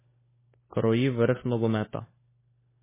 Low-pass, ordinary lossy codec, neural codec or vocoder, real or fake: 3.6 kHz; MP3, 16 kbps; codec, 16 kHz, 4 kbps, FunCodec, trained on LibriTTS, 50 frames a second; fake